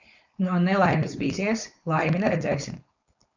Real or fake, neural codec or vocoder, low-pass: fake; codec, 16 kHz, 4.8 kbps, FACodec; 7.2 kHz